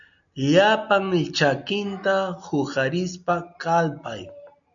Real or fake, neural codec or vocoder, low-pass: real; none; 7.2 kHz